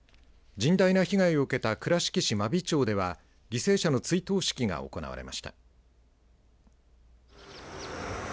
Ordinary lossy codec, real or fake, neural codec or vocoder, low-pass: none; real; none; none